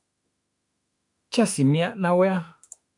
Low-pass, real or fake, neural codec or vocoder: 10.8 kHz; fake; autoencoder, 48 kHz, 32 numbers a frame, DAC-VAE, trained on Japanese speech